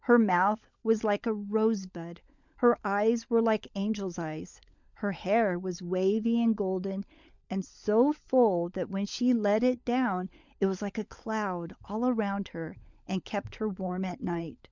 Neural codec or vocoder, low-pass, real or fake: codec, 16 kHz, 16 kbps, FunCodec, trained on LibriTTS, 50 frames a second; 7.2 kHz; fake